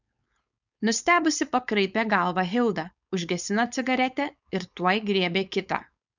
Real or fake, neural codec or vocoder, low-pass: fake; codec, 16 kHz, 4.8 kbps, FACodec; 7.2 kHz